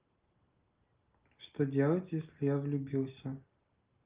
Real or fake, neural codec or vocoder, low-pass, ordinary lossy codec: real; none; 3.6 kHz; Opus, 24 kbps